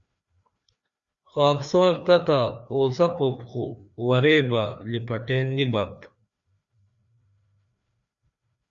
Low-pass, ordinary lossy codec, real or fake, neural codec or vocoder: 7.2 kHz; Opus, 64 kbps; fake; codec, 16 kHz, 2 kbps, FreqCodec, larger model